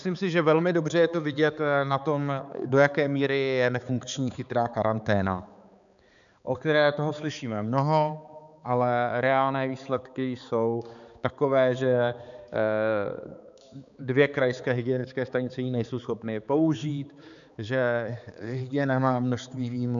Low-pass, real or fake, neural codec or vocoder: 7.2 kHz; fake; codec, 16 kHz, 4 kbps, X-Codec, HuBERT features, trained on balanced general audio